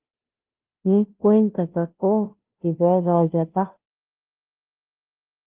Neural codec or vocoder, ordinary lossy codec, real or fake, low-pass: codec, 16 kHz, 0.5 kbps, FunCodec, trained on Chinese and English, 25 frames a second; Opus, 16 kbps; fake; 3.6 kHz